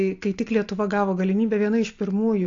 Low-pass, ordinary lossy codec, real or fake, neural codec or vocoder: 7.2 kHz; AAC, 48 kbps; real; none